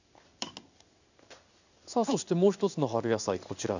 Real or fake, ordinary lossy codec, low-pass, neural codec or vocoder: fake; none; 7.2 kHz; codec, 16 kHz in and 24 kHz out, 1 kbps, XY-Tokenizer